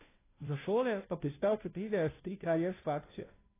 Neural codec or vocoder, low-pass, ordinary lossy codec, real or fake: codec, 16 kHz, 0.5 kbps, FunCodec, trained on Chinese and English, 25 frames a second; 3.6 kHz; AAC, 24 kbps; fake